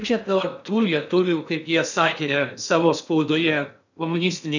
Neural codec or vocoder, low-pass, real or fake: codec, 16 kHz in and 24 kHz out, 0.6 kbps, FocalCodec, streaming, 2048 codes; 7.2 kHz; fake